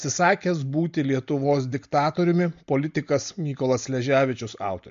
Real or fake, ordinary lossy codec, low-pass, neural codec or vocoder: real; MP3, 48 kbps; 7.2 kHz; none